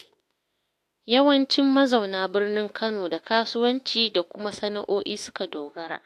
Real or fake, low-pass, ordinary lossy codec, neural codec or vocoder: fake; 14.4 kHz; AAC, 64 kbps; autoencoder, 48 kHz, 32 numbers a frame, DAC-VAE, trained on Japanese speech